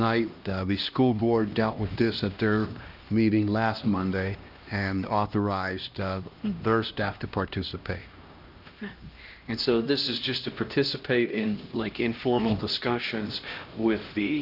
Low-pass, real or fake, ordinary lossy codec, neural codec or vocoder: 5.4 kHz; fake; Opus, 24 kbps; codec, 16 kHz, 1 kbps, X-Codec, HuBERT features, trained on LibriSpeech